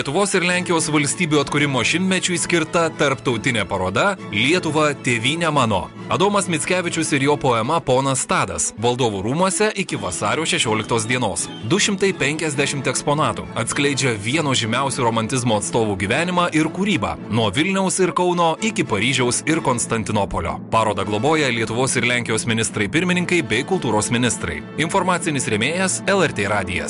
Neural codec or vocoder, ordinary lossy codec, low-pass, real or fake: none; MP3, 64 kbps; 10.8 kHz; real